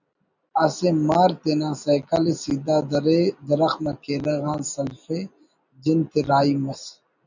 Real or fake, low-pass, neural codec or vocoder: real; 7.2 kHz; none